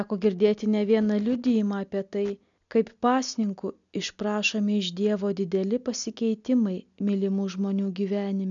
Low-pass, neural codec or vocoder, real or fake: 7.2 kHz; none; real